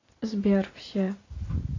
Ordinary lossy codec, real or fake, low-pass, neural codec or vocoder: AAC, 32 kbps; real; 7.2 kHz; none